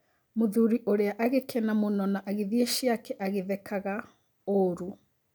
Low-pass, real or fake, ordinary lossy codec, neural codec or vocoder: none; real; none; none